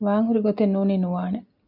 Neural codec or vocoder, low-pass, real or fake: none; 5.4 kHz; real